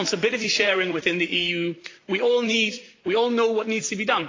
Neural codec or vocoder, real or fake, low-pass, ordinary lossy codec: vocoder, 44.1 kHz, 128 mel bands, Pupu-Vocoder; fake; 7.2 kHz; AAC, 32 kbps